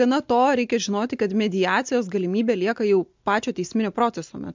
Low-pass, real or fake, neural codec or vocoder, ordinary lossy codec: 7.2 kHz; real; none; MP3, 64 kbps